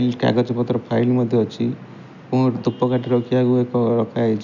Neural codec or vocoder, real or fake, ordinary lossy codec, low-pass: none; real; none; 7.2 kHz